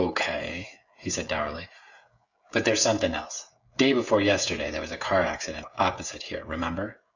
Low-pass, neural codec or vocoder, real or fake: 7.2 kHz; codec, 16 kHz, 16 kbps, FreqCodec, smaller model; fake